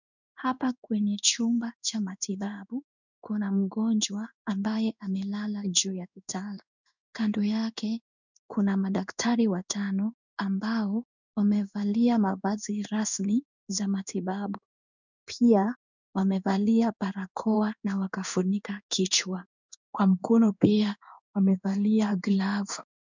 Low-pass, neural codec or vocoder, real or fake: 7.2 kHz; codec, 16 kHz in and 24 kHz out, 1 kbps, XY-Tokenizer; fake